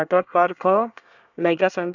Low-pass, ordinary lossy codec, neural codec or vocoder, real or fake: 7.2 kHz; none; codec, 24 kHz, 1 kbps, SNAC; fake